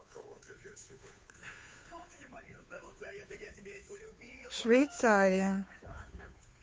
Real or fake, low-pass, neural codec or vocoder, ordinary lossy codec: fake; none; codec, 16 kHz, 2 kbps, FunCodec, trained on Chinese and English, 25 frames a second; none